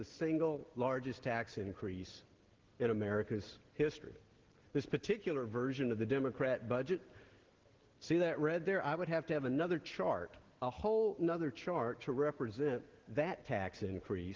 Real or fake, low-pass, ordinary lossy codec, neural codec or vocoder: real; 7.2 kHz; Opus, 16 kbps; none